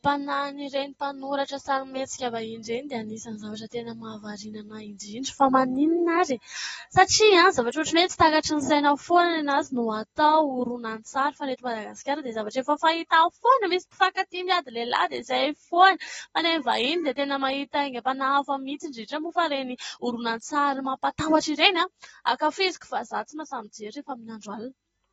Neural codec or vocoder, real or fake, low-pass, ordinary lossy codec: none; real; 19.8 kHz; AAC, 24 kbps